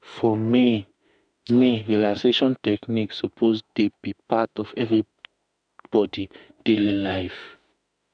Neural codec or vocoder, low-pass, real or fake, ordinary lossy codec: autoencoder, 48 kHz, 32 numbers a frame, DAC-VAE, trained on Japanese speech; 9.9 kHz; fake; none